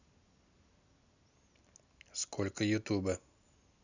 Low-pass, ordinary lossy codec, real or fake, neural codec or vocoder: 7.2 kHz; none; real; none